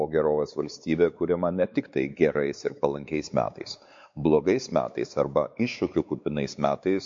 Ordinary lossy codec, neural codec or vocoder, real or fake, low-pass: MP3, 48 kbps; codec, 16 kHz, 4 kbps, X-Codec, WavLM features, trained on Multilingual LibriSpeech; fake; 7.2 kHz